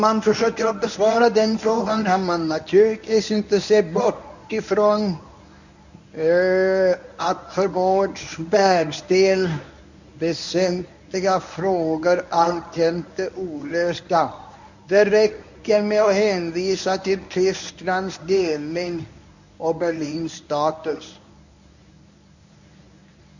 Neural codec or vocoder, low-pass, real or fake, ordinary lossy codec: codec, 24 kHz, 0.9 kbps, WavTokenizer, medium speech release version 1; 7.2 kHz; fake; none